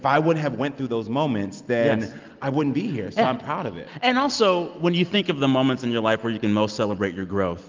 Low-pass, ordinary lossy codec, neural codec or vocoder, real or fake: 7.2 kHz; Opus, 24 kbps; none; real